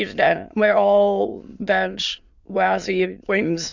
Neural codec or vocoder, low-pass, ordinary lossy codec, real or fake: autoencoder, 22.05 kHz, a latent of 192 numbers a frame, VITS, trained on many speakers; 7.2 kHz; Opus, 64 kbps; fake